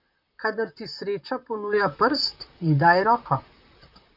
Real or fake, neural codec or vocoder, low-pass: fake; vocoder, 44.1 kHz, 128 mel bands, Pupu-Vocoder; 5.4 kHz